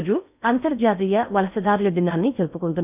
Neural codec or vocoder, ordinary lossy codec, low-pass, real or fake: codec, 16 kHz in and 24 kHz out, 0.6 kbps, FocalCodec, streaming, 4096 codes; none; 3.6 kHz; fake